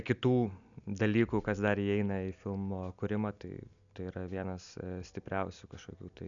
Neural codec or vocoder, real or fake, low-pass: none; real; 7.2 kHz